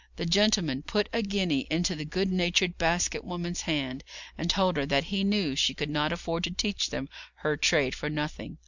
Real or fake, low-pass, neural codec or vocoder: real; 7.2 kHz; none